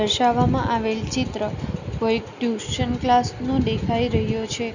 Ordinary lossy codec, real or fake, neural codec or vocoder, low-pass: none; real; none; 7.2 kHz